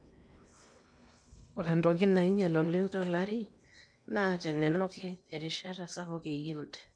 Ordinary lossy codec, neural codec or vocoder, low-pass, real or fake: none; codec, 16 kHz in and 24 kHz out, 0.8 kbps, FocalCodec, streaming, 65536 codes; 9.9 kHz; fake